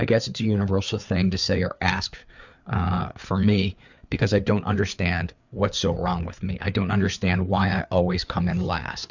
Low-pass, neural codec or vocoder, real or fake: 7.2 kHz; codec, 16 kHz, 4 kbps, FreqCodec, larger model; fake